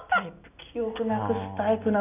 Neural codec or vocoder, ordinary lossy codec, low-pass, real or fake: none; none; 3.6 kHz; real